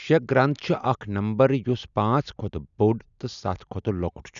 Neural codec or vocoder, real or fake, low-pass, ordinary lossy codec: none; real; 7.2 kHz; none